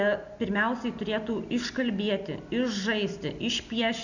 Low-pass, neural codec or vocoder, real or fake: 7.2 kHz; none; real